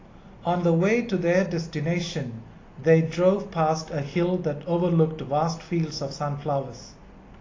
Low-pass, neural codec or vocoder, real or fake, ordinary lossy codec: 7.2 kHz; none; real; AAC, 32 kbps